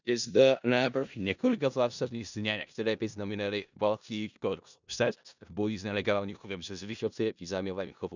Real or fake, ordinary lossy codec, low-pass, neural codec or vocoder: fake; none; 7.2 kHz; codec, 16 kHz in and 24 kHz out, 0.4 kbps, LongCat-Audio-Codec, four codebook decoder